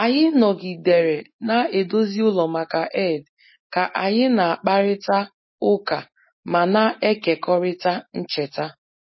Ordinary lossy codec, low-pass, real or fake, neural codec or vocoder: MP3, 24 kbps; 7.2 kHz; real; none